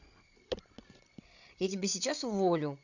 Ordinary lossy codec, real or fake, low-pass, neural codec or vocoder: none; fake; 7.2 kHz; codec, 16 kHz, 16 kbps, FreqCodec, larger model